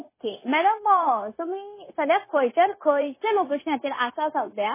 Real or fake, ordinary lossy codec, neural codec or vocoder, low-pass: fake; MP3, 16 kbps; codec, 16 kHz, 0.9 kbps, LongCat-Audio-Codec; 3.6 kHz